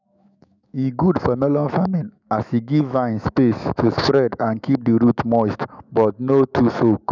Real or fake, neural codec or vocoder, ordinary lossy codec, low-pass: fake; autoencoder, 48 kHz, 128 numbers a frame, DAC-VAE, trained on Japanese speech; none; 7.2 kHz